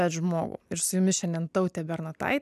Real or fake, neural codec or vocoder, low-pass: real; none; 14.4 kHz